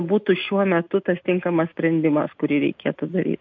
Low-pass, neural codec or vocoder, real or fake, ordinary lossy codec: 7.2 kHz; none; real; MP3, 48 kbps